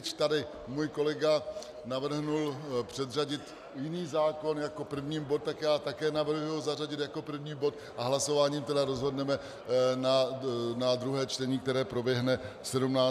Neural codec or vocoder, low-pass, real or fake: none; 14.4 kHz; real